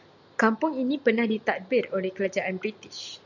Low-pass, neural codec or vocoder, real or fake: 7.2 kHz; none; real